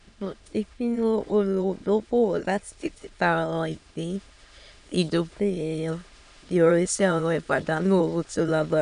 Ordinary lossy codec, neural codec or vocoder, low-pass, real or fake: none; autoencoder, 22.05 kHz, a latent of 192 numbers a frame, VITS, trained on many speakers; 9.9 kHz; fake